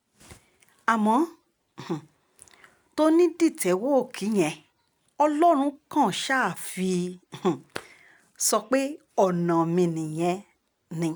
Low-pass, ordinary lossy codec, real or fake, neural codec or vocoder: none; none; real; none